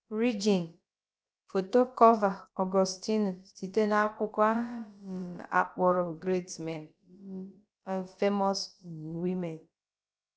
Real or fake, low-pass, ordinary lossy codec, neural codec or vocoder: fake; none; none; codec, 16 kHz, about 1 kbps, DyCAST, with the encoder's durations